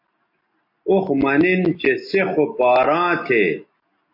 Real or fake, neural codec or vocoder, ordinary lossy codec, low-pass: real; none; MP3, 32 kbps; 5.4 kHz